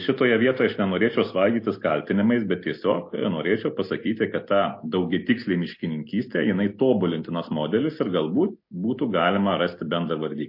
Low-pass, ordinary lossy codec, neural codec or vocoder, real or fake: 5.4 kHz; MP3, 32 kbps; none; real